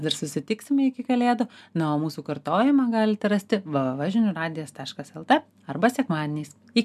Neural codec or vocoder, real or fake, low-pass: none; real; 14.4 kHz